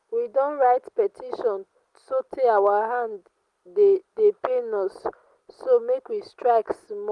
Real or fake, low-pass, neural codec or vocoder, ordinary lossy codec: real; 10.8 kHz; none; Opus, 24 kbps